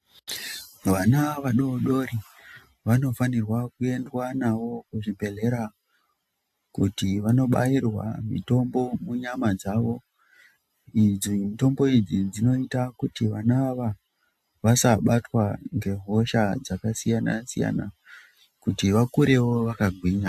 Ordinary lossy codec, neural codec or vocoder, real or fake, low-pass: AAC, 96 kbps; none; real; 14.4 kHz